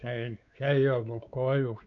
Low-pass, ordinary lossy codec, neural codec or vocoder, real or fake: 7.2 kHz; none; codec, 16 kHz, 4 kbps, X-Codec, WavLM features, trained on Multilingual LibriSpeech; fake